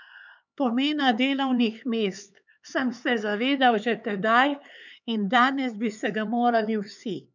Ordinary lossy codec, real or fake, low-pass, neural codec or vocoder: none; fake; 7.2 kHz; codec, 16 kHz, 4 kbps, X-Codec, HuBERT features, trained on LibriSpeech